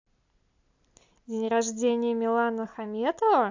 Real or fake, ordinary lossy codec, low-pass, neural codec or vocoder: real; AAC, 48 kbps; 7.2 kHz; none